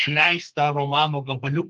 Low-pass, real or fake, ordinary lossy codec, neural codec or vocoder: 10.8 kHz; fake; Opus, 32 kbps; codec, 32 kHz, 1.9 kbps, SNAC